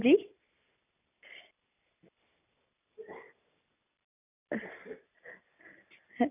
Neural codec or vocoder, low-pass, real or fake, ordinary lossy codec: none; 3.6 kHz; real; none